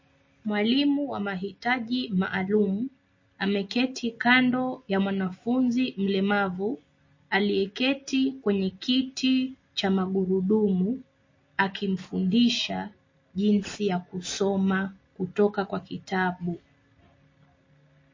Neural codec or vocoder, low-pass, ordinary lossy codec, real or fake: none; 7.2 kHz; MP3, 32 kbps; real